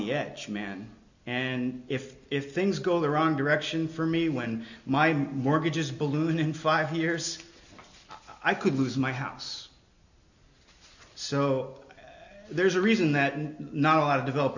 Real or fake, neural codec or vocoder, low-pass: real; none; 7.2 kHz